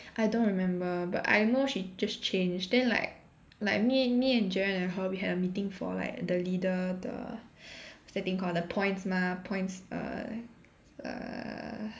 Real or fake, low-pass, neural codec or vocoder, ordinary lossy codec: real; none; none; none